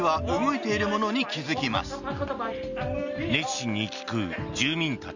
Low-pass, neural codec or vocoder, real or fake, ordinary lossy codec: 7.2 kHz; none; real; none